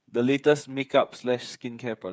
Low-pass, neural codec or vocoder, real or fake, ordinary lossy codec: none; codec, 16 kHz, 8 kbps, FreqCodec, smaller model; fake; none